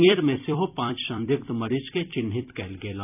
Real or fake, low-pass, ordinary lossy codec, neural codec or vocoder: fake; 3.6 kHz; none; vocoder, 44.1 kHz, 128 mel bands every 256 samples, BigVGAN v2